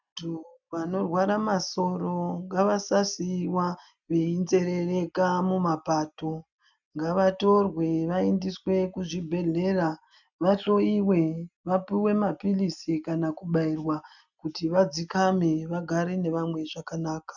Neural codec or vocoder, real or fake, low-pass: none; real; 7.2 kHz